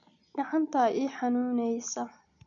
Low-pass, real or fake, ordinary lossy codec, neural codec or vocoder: 7.2 kHz; real; none; none